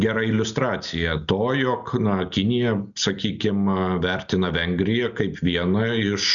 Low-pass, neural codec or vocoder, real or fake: 7.2 kHz; none; real